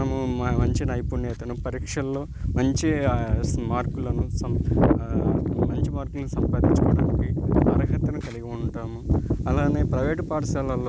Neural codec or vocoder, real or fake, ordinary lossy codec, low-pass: none; real; none; none